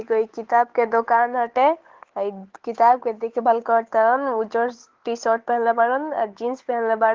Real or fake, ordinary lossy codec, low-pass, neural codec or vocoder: fake; Opus, 16 kbps; 7.2 kHz; codec, 16 kHz, 8 kbps, FunCodec, trained on Chinese and English, 25 frames a second